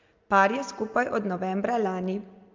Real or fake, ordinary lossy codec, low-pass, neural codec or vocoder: real; Opus, 24 kbps; 7.2 kHz; none